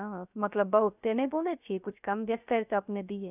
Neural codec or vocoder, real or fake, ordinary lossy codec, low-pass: codec, 16 kHz, 0.3 kbps, FocalCodec; fake; none; 3.6 kHz